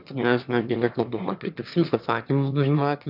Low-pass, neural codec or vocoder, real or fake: 5.4 kHz; autoencoder, 22.05 kHz, a latent of 192 numbers a frame, VITS, trained on one speaker; fake